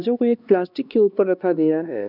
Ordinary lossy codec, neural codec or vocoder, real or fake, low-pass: none; codec, 16 kHz, 2 kbps, X-Codec, HuBERT features, trained on LibriSpeech; fake; 5.4 kHz